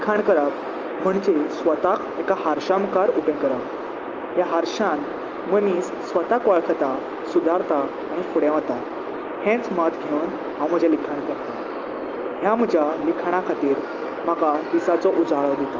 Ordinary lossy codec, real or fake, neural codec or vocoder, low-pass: Opus, 24 kbps; real; none; 7.2 kHz